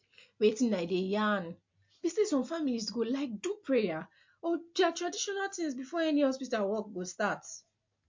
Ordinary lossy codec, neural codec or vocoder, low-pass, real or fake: MP3, 48 kbps; none; 7.2 kHz; real